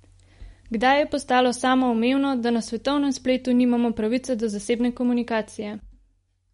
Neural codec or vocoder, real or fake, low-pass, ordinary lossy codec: none; real; 10.8 kHz; MP3, 48 kbps